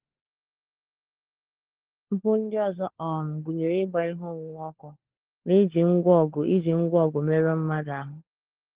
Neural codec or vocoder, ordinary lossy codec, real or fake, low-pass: codec, 16 kHz, 4 kbps, FunCodec, trained on LibriTTS, 50 frames a second; Opus, 16 kbps; fake; 3.6 kHz